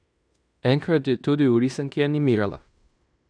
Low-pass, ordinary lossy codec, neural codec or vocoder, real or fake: 9.9 kHz; none; codec, 16 kHz in and 24 kHz out, 0.9 kbps, LongCat-Audio-Codec, fine tuned four codebook decoder; fake